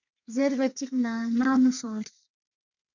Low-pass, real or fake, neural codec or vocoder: 7.2 kHz; fake; codec, 32 kHz, 1.9 kbps, SNAC